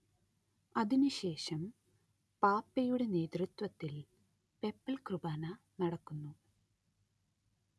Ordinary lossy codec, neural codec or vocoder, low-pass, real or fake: none; none; none; real